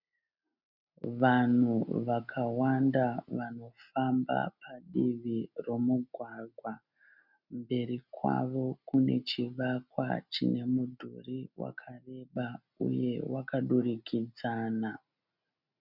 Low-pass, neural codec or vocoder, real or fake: 5.4 kHz; none; real